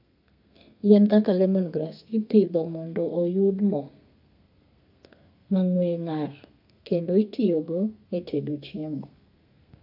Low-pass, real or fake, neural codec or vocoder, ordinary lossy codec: 5.4 kHz; fake; codec, 32 kHz, 1.9 kbps, SNAC; AAC, 48 kbps